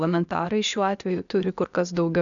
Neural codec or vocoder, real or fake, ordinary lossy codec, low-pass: codec, 16 kHz, 0.8 kbps, ZipCodec; fake; MP3, 96 kbps; 7.2 kHz